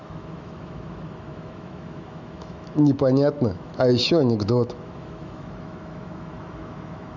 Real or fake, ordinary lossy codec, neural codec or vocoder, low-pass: fake; none; autoencoder, 48 kHz, 128 numbers a frame, DAC-VAE, trained on Japanese speech; 7.2 kHz